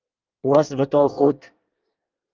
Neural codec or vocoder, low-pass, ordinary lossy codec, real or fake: codec, 24 kHz, 1 kbps, SNAC; 7.2 kHz; Opus, 32 kbps; fake